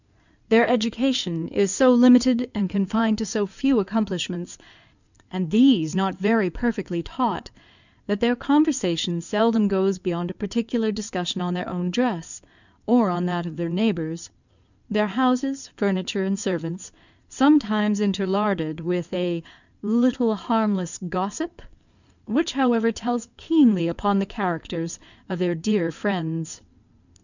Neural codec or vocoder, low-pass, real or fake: codec, 16 kHz in and 24 kHz out, 2.2 kbps, FireRedTTS-2 codec; 7.2 kHz; fake